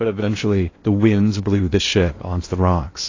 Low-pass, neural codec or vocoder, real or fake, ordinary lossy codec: 7.2 kHz; codec, 16 kHz in and 24 kHz out, 0.8 kbps, FocalCodec, streaming, 65536 codes; fake; AAC, 32 kbps